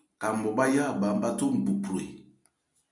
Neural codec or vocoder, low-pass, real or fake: none; 10.8 kHz; real